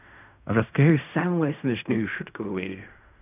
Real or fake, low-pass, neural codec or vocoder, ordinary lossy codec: fake; 3.6 kHz; codec, 16 kHz in and 24 kHz out, 0.4 kbps, LongCat-Audio-Codec, fine tuned four codebook decoder; none